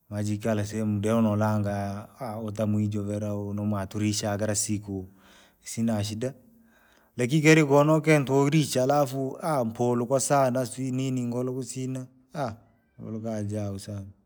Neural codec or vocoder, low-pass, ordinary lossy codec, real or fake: none; none; none; real